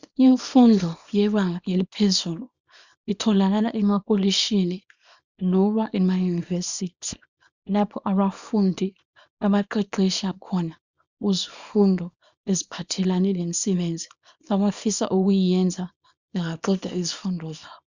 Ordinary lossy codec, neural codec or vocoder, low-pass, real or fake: Opus, 64 kbps; codec, 24 kHz, 0.9 kbps, WavTokenizer, small release; 7.2 kHz; fake